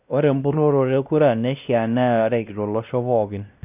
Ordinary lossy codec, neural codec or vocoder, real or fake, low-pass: none; codec, 16 kHz, 1 kbps, X-Codec, WavLM features, trained on Multilingual LibriSpeech; fake; 3.6 kHz